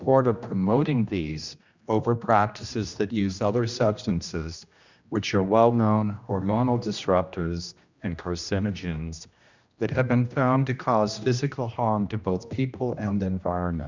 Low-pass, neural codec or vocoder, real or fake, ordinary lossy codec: 7.2 kHz; codec, 16 kHz, 1 kbps, X-Codec, HuBERT features, trained on general audio; fake; Opus, 64 kbps